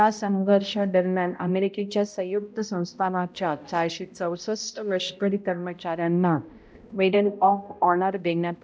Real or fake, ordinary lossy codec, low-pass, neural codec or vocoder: fake; none; none; codec, 16 kHz, 0.5 kbps, X-Codec, HuBERT features, trained on balanced general audio